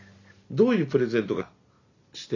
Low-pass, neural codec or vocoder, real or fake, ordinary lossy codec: 7.2 kHz; none; real; none